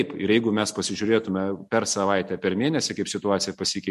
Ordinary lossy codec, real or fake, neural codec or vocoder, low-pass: MP3, 64 kbps; real; none; 14.4 kHz